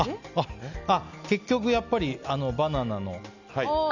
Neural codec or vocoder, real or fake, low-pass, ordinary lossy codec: none; real; 7.2 kHz; none